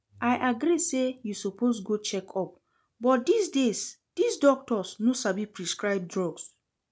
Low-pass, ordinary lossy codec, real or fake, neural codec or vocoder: none; none; real; none